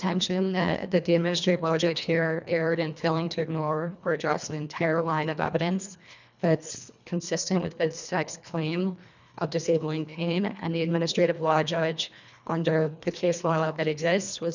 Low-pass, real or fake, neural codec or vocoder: 7.2 kHz; fake; codec, 24 kHz, 1.5 kbps, HILCodec